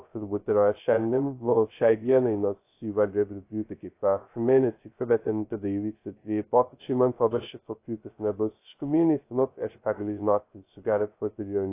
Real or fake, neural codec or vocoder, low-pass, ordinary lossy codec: fake; codec, 16 kHz, 0.2 kbps, FocalCodec; 3.6 kHz; AAC, 24 kbps